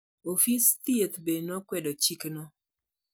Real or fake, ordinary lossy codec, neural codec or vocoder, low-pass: real; none; none; none